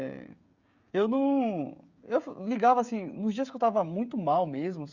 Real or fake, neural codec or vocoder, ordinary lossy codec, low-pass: fake; codec, 16 kHz, 16 kbps, FreqCodec, smaller model; Opus, 64 kbps; 7.2 kHz